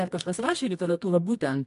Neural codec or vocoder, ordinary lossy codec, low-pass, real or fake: codec, 24 kHz, 0.9 kbps, WavTokenizer, medium music audio release; MP3, 64 kbps; 10.8 kHz; fake